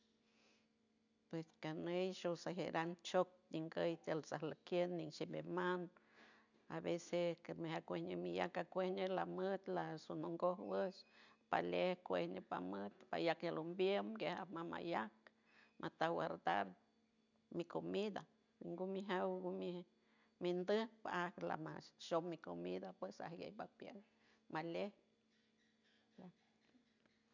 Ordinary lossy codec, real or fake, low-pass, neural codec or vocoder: none; real; 7.2 kHz; none